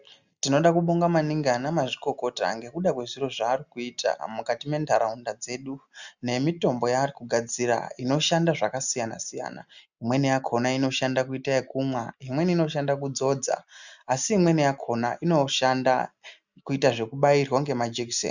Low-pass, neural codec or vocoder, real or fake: 7.2 kHz; none; real